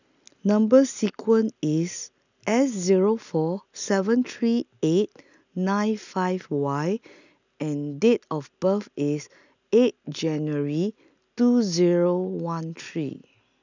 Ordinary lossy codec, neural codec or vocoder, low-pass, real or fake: none; none; 7.2 kHz; real